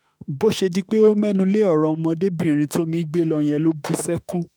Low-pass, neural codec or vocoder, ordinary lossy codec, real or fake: none; autoencoder, 48 kHz, 32 numbers a frame, DAC-VAE, trained on Japanese speech; none; fake